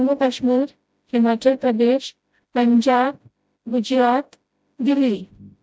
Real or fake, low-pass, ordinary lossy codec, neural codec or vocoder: fake; none; none; codec, 16 kHz, 0.5 kbps, FreqCodec, smaller model